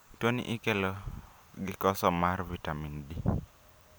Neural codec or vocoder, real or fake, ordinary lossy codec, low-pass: none; real; none; none